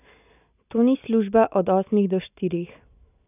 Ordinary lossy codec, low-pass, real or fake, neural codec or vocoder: none; 3.6 kHz; real; none